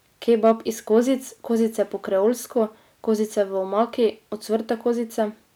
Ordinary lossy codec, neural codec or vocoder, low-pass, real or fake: none; none; none; real